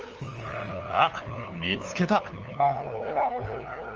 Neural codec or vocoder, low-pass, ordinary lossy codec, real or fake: codec, 16 kHz, 2 kbps, FunCodec, trained on LibriTTS, 25 frames a second; 7.2 kHz; Opus, 24 kbps; fake